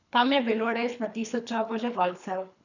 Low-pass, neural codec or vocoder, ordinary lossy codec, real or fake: 7.2 kHz; codec, 24 kHz, 3 kbps, HILCodec; none; fake